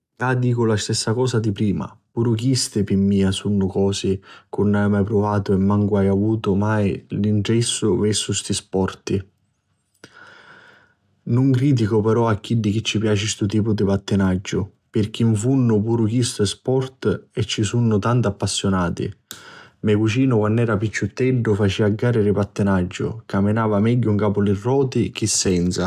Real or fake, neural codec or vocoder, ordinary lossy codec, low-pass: real; none; AAC, 96 kbps; 14.4 kHz